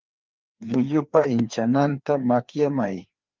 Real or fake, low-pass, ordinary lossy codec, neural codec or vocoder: fake; 7.2 kHz; Opus, 24 kbps; codec, 16 kHz, 2 kbps, FreqCodec, larger model